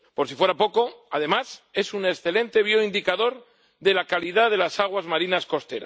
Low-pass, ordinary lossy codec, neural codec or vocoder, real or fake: none; none; none; real